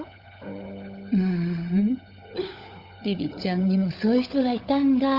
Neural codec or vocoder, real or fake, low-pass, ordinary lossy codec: codec, 16 kHz, 16 kbps, FunCodec, trained on LibriTTS, 50 frames a second; fake; 5.4 kHz; Opus, 32 kbps